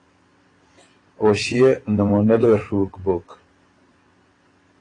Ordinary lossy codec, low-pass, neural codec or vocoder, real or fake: AAC, 32 kbps; 9.9 kHz; vocoder, 22.05 kHz, 80 mel bands, WaveNeXt; fake